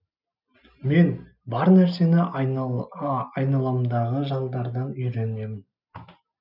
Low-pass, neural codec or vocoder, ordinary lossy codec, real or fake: 5.4 kHz; none; none; real